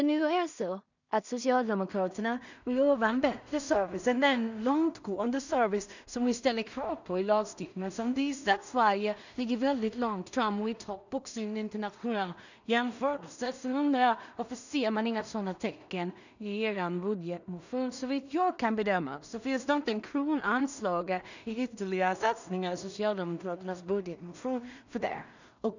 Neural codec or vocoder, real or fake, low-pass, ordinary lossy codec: codec, 16 kHz in and 24 kHz out, 0.4 kbps, LongCat-Audio-Codec, two codebook decoder; fake; 7.2 kHz; none